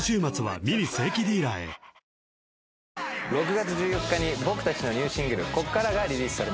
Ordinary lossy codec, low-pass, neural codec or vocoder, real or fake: none; none; none; real